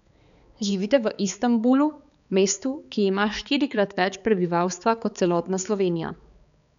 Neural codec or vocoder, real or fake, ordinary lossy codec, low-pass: codec, 16 kHz, 4 kbps, X-Codec, HuBERT features, trained on balanced general audio; fake; none; 7.2 kHz